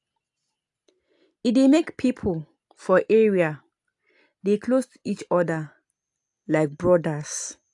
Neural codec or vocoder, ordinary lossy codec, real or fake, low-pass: none; AAC, 64 kbps; real; 10.8 kHz